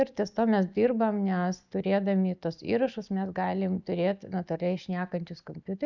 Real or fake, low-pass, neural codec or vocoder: real; 7.2 kHz; none